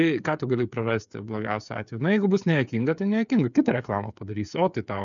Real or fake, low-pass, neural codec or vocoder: fake; 7.2 kHz; codec, 16 kHz, 8 kbps, FreqCodec, smaller model